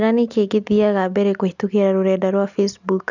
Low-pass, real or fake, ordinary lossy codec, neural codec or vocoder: 7.2 kHz; real; none; none